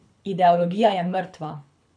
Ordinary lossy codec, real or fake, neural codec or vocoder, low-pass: none; fake; codec, 24 kHz, 6 kbps, HILCodec; 9.9 kHz